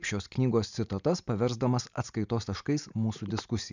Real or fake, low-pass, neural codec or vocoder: real; 7.2 kHz; none